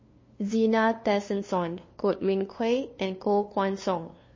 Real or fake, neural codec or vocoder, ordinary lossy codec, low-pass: fake; codec, 16 kHz, 2 kbps, FunCodec, trained on LibriTTS, 25 frames a second; MP3, 32 kbps; 7.2 kHz